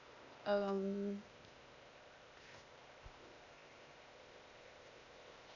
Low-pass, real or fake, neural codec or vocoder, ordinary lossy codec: 7.2 kHz; fake; codec, 16 kHz, 0.8 kbps, ZipCodec; none